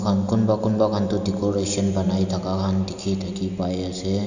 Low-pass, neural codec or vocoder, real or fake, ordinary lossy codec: 7.2 kHz; none; real; none